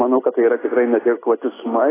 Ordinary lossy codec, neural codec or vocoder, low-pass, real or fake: AAC, 16 kbps; none; 3.6 kHz; real